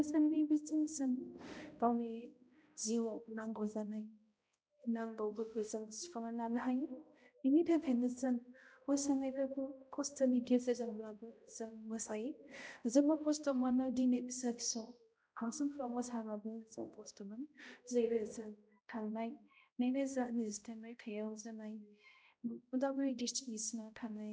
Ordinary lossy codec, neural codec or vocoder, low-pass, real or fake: none; codec, 16 kHz, 0.5 kbps, X-Codec, HuBERT features, trained on balanced general audio; none; fake